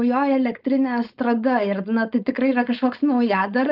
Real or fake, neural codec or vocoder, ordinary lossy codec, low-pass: fake; codec, 16 kHz, 4.8 kbps, FACodec; Opus, 32 kbps; 5.4 kHz